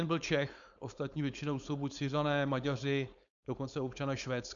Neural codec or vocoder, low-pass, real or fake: codec, 16 kHz, 4.8 kbps, FACodec; 7.2 kHz; fake